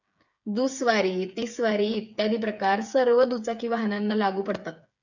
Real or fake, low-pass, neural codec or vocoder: fake; 7.2 kHz; codec, 16 kHz, 8 kbps, FreqCodec, smaller model